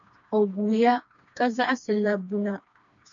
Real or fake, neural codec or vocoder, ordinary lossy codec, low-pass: fake; codec, 16 kHz, 2 kbps, FreqCodec, smaller model; AAC, 64 kbps; 7.2 kHz